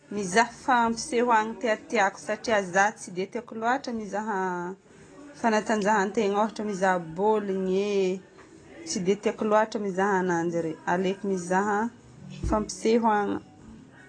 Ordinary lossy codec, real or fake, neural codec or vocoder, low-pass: AAC, 32 kbps; real; none; 9.9 kHz